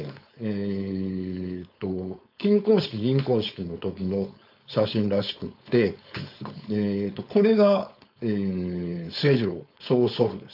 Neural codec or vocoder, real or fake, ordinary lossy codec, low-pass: codec, 16 kHz, 4.8 kbps, FACodec; fake; AAC, 48 kbps; 5.4 kHz